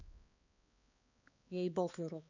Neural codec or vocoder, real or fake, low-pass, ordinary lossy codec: codec, 16 kHz, 2 kbps, X-Codec, HuBERT features, trained on balanced general audio; fake; 7.2 kHz; none